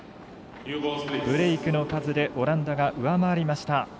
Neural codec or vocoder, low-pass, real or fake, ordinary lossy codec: none; none; real; none